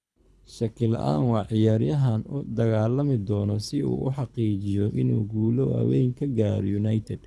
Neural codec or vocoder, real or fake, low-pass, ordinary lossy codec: codec, 24 kHz, 6 kbps, HILCodec; fake; none; none